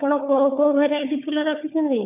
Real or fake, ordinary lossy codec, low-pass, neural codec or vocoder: fake; none; 3.6 kHz; codec, 16 kHz, 4 kbps, FunCodec, trained on LibriTTS, 50 frames a second